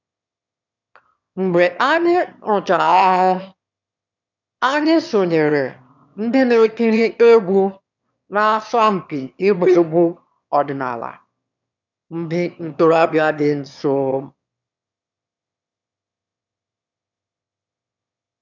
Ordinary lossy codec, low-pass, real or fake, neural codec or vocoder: none; 7.2 kHz; fake; autoencoder, 22.05 kHz, a latent of 192 numbers a frame, VITS, trained on one speaker